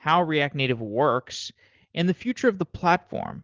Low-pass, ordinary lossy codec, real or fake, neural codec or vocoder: 7.2 kHz; Opus, 16 kbps; real; none